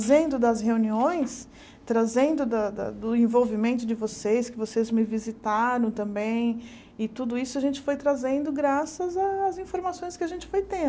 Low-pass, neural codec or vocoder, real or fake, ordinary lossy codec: none; none; real; none